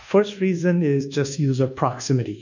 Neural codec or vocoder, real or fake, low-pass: codec, 24 kHz, 1.2 kbps, DualCodec; fake; 7.2 kHz